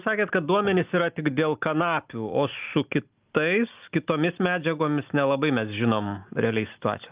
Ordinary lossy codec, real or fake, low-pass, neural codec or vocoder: Opus, 24 kbps; real; 3.6 kHz; none